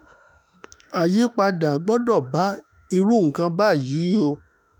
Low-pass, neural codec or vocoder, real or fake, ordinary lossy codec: none; autoencoder, 48 kHz, 32 numbers a frame, DAC-VAE, trained on Japanese speech; fake; none